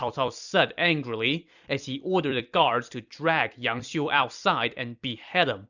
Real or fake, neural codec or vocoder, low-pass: fake; vocoder, 44.1 kHz, 128 mel bands every 256 samples, BigVGAN v2; 7.2 kHz